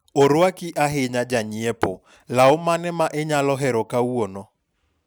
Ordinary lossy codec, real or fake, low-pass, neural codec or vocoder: none; real; none; none